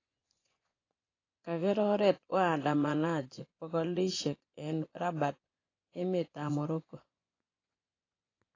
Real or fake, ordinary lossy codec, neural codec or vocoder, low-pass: fake; AAC, 32 kbps; vocoder, 24 kHz, 100 mel bands, Vocos; 7.2 kHz